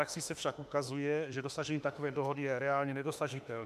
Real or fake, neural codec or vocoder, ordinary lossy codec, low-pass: fake; autoencoder, 48 kHz, 32 numbers a frame, DAC-VAE, trained on Japanese speech; MP3, 96 kbps; 14.4 kHz